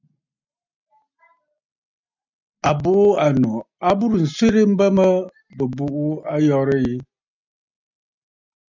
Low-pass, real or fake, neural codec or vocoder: 7.2 kHz; real; none